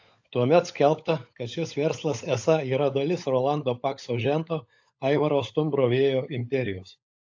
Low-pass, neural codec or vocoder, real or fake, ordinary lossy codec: 7.2 kHz; codec, 16 kHz, 16 kbps, FunCodec, trained on LibriTTS, 50 frames a second; fake; AAC, 48 kbps